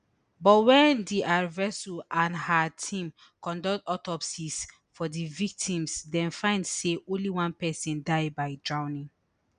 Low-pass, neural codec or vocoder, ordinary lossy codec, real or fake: 9.9 kHz; none; none; real